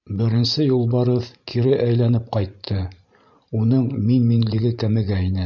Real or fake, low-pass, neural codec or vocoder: fake; 7.2 kHz; vocoder, 44.1 kHz, 128 mel bands every 512 samples, BigVGAN v2